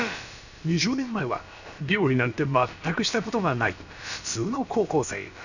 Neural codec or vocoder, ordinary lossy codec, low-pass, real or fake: codec, 16 kHz, about 1 kbps, DyCAST, with the encoder's durations; none; 7.2 kHz; fake